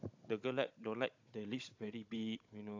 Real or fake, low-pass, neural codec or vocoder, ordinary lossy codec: fake; 7.2 kHz; codec, 16 kHz, 16 kbps, FunCodec, trained on Chinese and English, 50 frames a second; AAC, 48 kbps